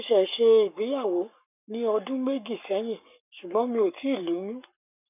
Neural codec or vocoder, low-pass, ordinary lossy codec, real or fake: codec, 44.1 kHz, 7.8 kbps, Pupu-Codec; 3.6 kHz; none; fake